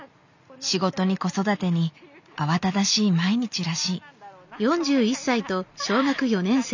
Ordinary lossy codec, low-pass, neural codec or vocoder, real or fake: none; 7.2 kHz; none; real